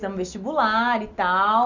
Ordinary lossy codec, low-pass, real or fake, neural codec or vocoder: none; 7.2 kHz; real; none